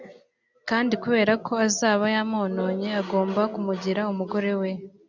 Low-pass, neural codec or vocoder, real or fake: 7.2 kHz; none; real